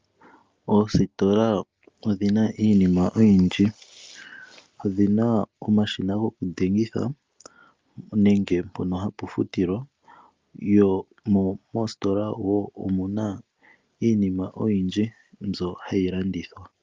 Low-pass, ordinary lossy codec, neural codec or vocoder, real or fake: 7.2 kHz; Opus, 24 kbps; none; real